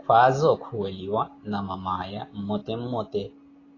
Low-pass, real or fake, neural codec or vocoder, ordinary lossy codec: 7.2 kHz; real; none; AAC, 32 kbps